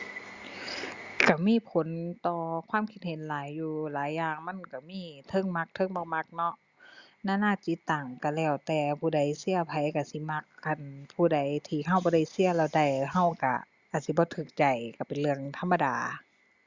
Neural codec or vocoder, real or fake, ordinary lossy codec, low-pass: none; real; Opus, 64 kbps; 7.2 kHz